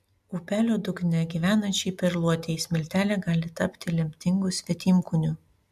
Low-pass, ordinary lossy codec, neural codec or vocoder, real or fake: 14.4 kHz; AAC, 96 kbps; none; real